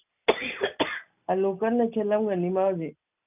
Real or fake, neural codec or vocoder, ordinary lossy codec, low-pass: real; none; none; 3.6 kHz